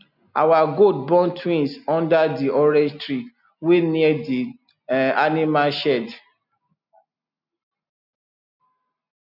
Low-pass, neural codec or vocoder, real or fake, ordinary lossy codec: 5.4 kHz; none; real; none